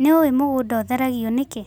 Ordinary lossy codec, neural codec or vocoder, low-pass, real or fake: none; none; none; real